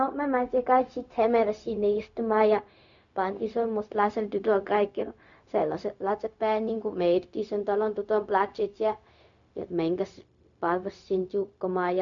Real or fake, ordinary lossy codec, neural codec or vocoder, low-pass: fake; none; codec, 16 kHz, 0.4 kbps, LongCat-Audio-Codec; 7.2 kHz